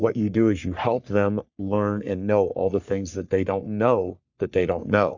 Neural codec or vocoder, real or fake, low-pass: codec, 44.1 kHz, 3.4 kbps, Pupu-Codec; fake; 7.2 kHz